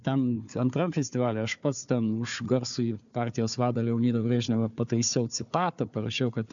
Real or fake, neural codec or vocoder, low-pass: fake; codec, 16 kHz, 4 kbps, FunCodec, trained on Chinese and English, 50 frames a second; 7.2 kHz